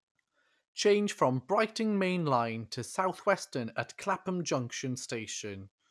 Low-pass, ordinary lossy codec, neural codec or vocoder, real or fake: none; none; none; real